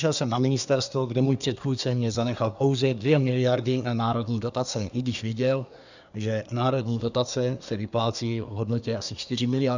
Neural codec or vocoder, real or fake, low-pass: codec, 24 kHz, 1 kbps, SNAC; fake; 7.2 kHz